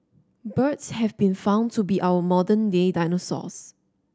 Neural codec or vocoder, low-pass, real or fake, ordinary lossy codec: none; none; real; none